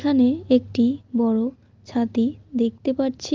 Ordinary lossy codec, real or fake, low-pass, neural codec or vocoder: Opus, 24 kbps; real; 7.2 kHz; none